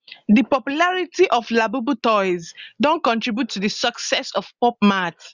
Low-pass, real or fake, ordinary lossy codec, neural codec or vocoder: 7.2 kHz; real; Opus, 64 kbps; none